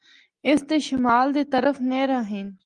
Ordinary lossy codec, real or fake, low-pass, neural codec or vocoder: Opus, 24 kbps; real; 10.8 kHz; none